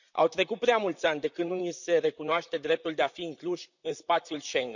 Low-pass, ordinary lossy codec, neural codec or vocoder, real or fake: 7.2 kHz; none; vocoder, 44.1 kHz, 128 mel bands, Pupu-Vocoder; fake